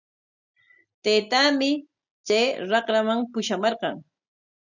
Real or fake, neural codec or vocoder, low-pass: real; none; 7.2 kHz